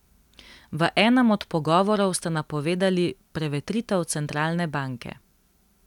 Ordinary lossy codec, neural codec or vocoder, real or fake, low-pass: none; none; real; 19.8 kHz